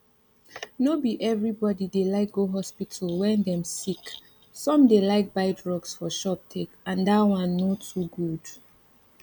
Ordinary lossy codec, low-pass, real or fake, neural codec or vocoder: none; none; real; none